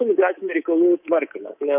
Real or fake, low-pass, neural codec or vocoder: fake; 3.6 kHz; codec, 24 kHz, 3.1 kbps, DualCodec